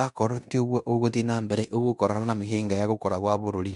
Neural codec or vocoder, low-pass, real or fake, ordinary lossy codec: codec, 16 kHz in and 24 kHz out, 0.9 kbps, LongCat-Audio-Codec, fine tuned four codebook decoder; 10.8 kHz; fake; none